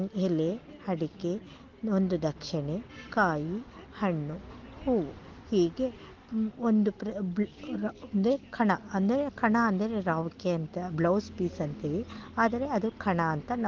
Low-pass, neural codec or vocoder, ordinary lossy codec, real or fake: 7.2 kHz; none; Opus, 16 kbps; real